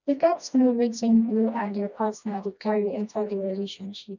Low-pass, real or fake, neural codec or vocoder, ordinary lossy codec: 7.2 kHz; fake; codec, 16 kHz, 1 kbps, FreqCodec, smaller model; none